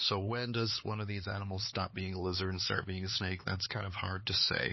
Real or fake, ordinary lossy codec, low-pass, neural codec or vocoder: fake; MP3, 24 kbps; 7.2 kHz; codec, 16 kHz, 4 kbps, X-Codec, HuBERT features, trained on LibriSpeech